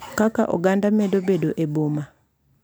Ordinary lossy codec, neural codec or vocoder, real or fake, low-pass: none; none; real; none